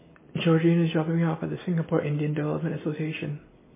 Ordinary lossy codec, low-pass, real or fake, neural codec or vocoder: MP3, 16 kbps; 3.6 kHz; real; none